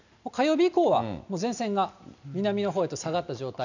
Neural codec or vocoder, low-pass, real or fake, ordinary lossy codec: none; 7.2 kHz; real; none